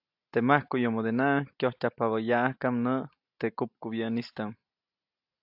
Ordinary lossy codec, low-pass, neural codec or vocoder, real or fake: AAC, 48 kbps; 5.4 kHz; none; real